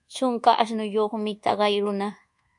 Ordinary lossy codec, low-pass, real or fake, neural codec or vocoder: MP3, 64 kbps; 10.8 kHz; fake; codec, 24 kHz, 1.2 kbps, DualCodec